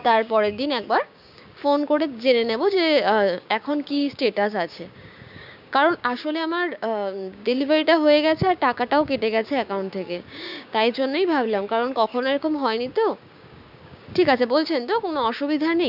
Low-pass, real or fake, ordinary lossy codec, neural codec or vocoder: 5.4 kHz; fake; none; codec, 16 kHz, 6 kbps, DAC